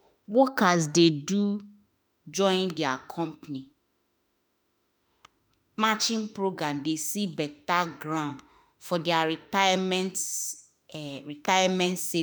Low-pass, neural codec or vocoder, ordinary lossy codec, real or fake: none; autoencoder, 48 kHz, 32 numbers a frame, DAC-VAE, trained on Japanese speech; none; fake